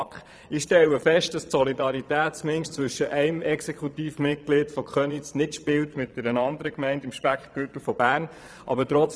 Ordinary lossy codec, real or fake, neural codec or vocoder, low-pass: none; fake; vocoder, 22.05 kHz, 80 mel bands, Vocos; none